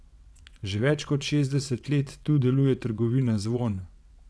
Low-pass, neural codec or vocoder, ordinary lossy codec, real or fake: none; vocoder, 22.05 kHz, 80 mel bands, WaveNeXt; none; fake